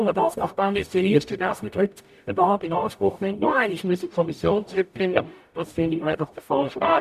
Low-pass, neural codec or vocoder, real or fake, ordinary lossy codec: 14.4 kHz; codec, 44.1 kHz, 0.9 kbps, DAC; fake; none